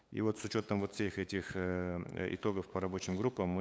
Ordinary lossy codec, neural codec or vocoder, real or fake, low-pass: none; codec, 16 kHz, 8 kbps, FunCodec, trained on LibriTTS, 25 frames a second; fake; none